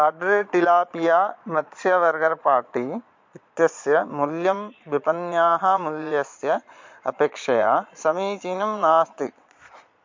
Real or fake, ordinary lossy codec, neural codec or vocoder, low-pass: fake; MP3, 48 kbps; vocoder, 22.05 kHz, 80 mel bands, Vocos; 7.2 kHz